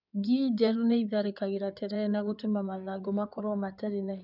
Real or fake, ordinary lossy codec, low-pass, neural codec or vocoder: fake; none; 5.4 kHz; codec, 16 kHz in and 24 kHz out, 2.2 kbps, FireRedTTS-2 codec